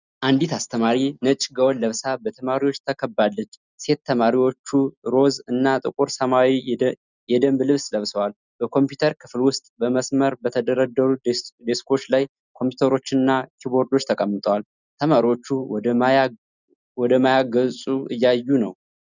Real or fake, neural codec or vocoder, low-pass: real; none; 7.2 kHz